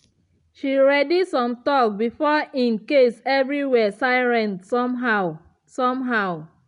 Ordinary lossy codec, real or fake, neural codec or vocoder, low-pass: none; real; none; 10.8 kHz